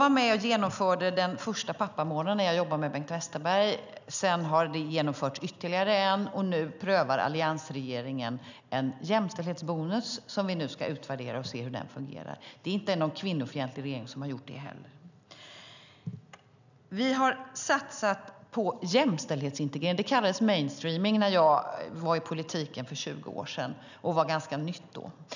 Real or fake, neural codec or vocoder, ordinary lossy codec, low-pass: real; none; none; 7.2 kHz